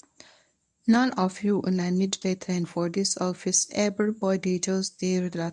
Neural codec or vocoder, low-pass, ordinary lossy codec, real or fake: codec, 24 kHz, 0.9 kbps, WavTokenizer, medium speech release version 1; none; none; fake